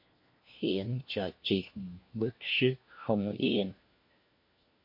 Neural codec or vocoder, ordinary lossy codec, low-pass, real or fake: codec, 16 kHz, 1 kbps, FunCodec, trained on LibriTTS, 50 frames a second; MP3, 32 kbps; 5.4 kHz; fake